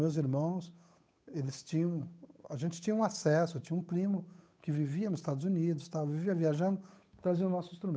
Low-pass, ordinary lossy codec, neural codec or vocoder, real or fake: none; none; codec, 16 kHz, 8 kbps, FunCodec, trained on Chinese and English, 25 frames a second; fake